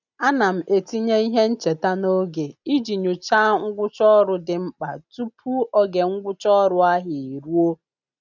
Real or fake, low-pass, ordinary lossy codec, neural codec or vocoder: real; 7.2 kHz; none; none